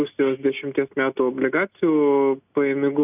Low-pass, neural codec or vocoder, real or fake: 3.6 kHz; none; real